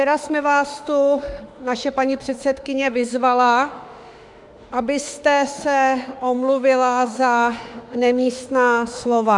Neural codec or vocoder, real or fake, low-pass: autoencoder, 48 kHz, 32 numbers a frame, DAC-VAE, trained on Japanese speech; fake; 10.8 kHz